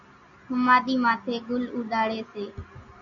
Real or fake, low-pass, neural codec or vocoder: real; 7.2 kHz; none